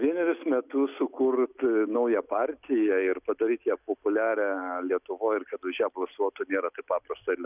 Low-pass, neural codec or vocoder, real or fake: 3.6 kHz; none; real